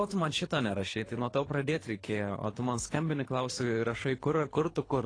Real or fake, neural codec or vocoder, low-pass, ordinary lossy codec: fake; codec, 24 kHz, 3 kbps, HILCodec; 9.9 kHz; AAC, 32 kbps